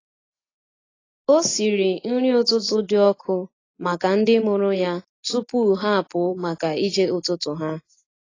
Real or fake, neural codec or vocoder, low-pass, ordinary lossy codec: real; none; 7.2 kHz; AAC, 32 kbps